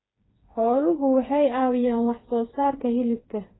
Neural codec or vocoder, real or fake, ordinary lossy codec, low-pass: codec, 16 kHz, 4 kbps, FreqCodec, smaller model; fake; AAC, 16 kbps; 7.2 kHz